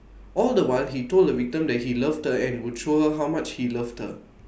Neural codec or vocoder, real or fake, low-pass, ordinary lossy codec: none; real; none; none